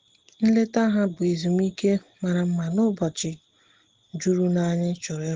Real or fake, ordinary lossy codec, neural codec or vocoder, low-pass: real; Opus, 16 kbps; none; 7.2 kHz